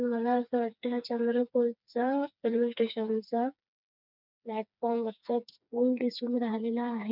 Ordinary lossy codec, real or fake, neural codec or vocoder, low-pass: none; fake; codec, 16 kHz, 4 kbps, FreqCodec, smaller model; 5.4 kHz